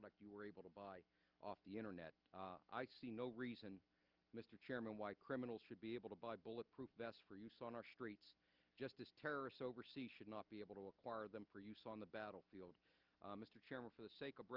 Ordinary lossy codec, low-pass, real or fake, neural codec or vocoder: Opus, 32 kbps; 5.4 kHz; real; none